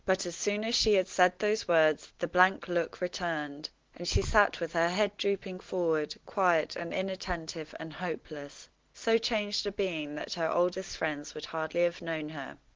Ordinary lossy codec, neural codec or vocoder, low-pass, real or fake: Opus, 16 kbps; none; 7.2 kHz; real